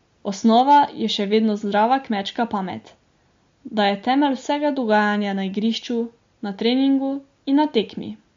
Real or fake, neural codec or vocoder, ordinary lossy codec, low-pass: real; none; MP3, 48 kbps; 7.2 kHz